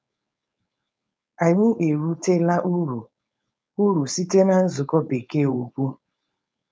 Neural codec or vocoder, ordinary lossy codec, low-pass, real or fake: codec, 16 kHz, 4.8 kbps, FACodec; none; none; fake